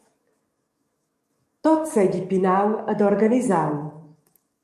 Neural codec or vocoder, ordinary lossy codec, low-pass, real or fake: codec, 44.1 kHz, 7.8 kbps, DAC; MP3, 64 kbps; 14.4 kHz; fake